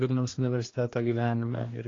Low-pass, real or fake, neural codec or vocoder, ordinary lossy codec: 7.2 kHz; fake; codec, 16 kHz, 2 kbps, X-Codec, HuBERT features, trained on general audio; AAC, 32 kbps